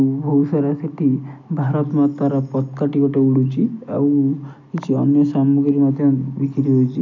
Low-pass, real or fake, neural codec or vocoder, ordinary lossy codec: 7.2 kHz; real; none; none